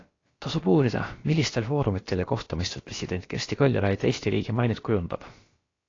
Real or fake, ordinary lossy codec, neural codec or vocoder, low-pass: fake; AAC, 32 kbps; codec, 16 kHz, about 1 kbps, DyCAST, with the encoder's durations; 7.2 kHz